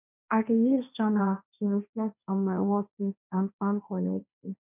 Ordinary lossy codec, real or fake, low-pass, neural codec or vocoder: none; fake; 3.6 kHz; codec, 16 kHz, 1.1 kbps, Voila-Tokenizer